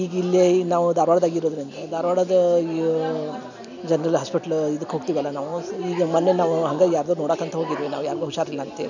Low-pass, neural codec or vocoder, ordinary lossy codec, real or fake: 7.2 kHz; none; none; real